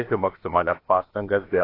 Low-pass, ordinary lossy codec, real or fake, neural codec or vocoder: 5.4 kHz; AAC, 24 kbps; fake; codec, 16 kHz, about 1 kbps, DyCAST, with the encoder's durations